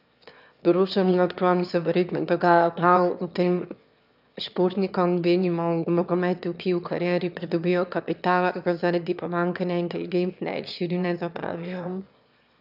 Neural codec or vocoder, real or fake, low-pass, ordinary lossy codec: autoencoder, 22.05 kHz, a latent of 192 numbers a frame, VITS, trained on one speaker; fake; 5.4 kHz; none